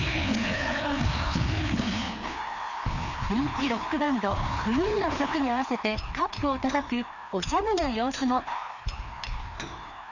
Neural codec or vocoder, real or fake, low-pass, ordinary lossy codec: codec, 16 kHz, 2 kbps, FreqCodec, larger model; fake; 7.2 kHz; none